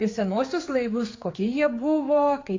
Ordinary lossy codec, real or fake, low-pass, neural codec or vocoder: AAC, 32 kbps; fake; 7.2 kHz; codec, 16 kHz, 4 kbps, X-Codec, HuBERT features, trained on general audio